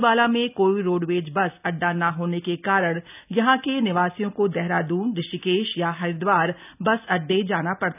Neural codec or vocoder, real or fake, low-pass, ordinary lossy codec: none; real; 3.6 kHz; none